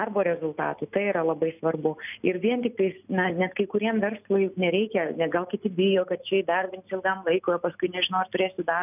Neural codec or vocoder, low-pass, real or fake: none; 3.6 kHz; real